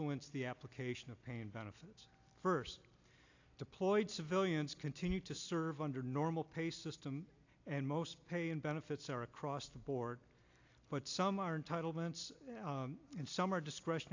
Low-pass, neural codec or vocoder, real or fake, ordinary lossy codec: 7.2 kHz; none; real; AAC, 48 kbps